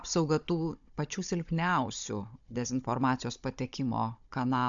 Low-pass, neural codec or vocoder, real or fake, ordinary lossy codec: 7.2 kHz; codec, 16 kHz, 16 kbps, FunCodec, trained on Chinese and English, 50 frames a second; fake; MP3, 64 kbps